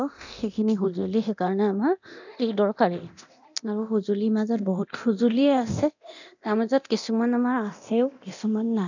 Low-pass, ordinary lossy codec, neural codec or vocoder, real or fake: 7.2 kHz; none; codec, 24 kHz, 0.9 kbps, DualCodec; fake